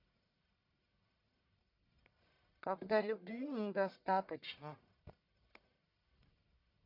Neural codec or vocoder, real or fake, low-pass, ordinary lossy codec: codec, 44.1 kHz, 1.7 kbps, Pupu-Codec; fake; 5.4 kHz; none